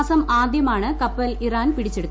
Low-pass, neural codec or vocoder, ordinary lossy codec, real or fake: none; none; none; real